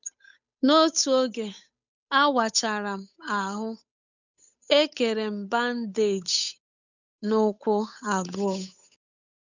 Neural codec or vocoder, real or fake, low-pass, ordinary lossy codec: codec, 16 kHz, 8 kbps, FunCodec, trained on Chinese and English, 25 frames a second; fake; 7.2 kHz; none